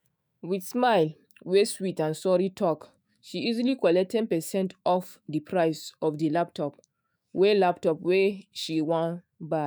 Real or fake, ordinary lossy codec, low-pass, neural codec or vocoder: fake; none; none; autoencoder, 48 kHz, 128 numbers a frame, DAC-VAE, trained on Japanese speech